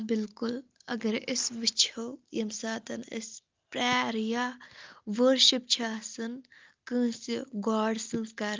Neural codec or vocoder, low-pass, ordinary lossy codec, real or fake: none; 7.2 kHz; Opus, 32 kbps; real